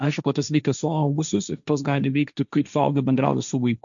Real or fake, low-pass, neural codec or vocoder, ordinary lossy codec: fake; 7.2 kHz; codec, 16 kHz, 1.1 kbps, Voila-Tokenizer; MP3, 96 kbps